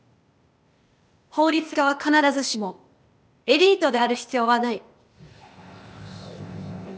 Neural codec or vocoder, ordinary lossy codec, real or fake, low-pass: codec, 16 kHz, 0.8 kbps, ZipCodec; none; fake; none